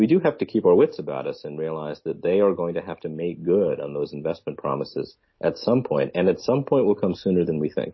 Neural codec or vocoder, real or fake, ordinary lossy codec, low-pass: none; real; MP3, 24 kbps; 7.2 kHz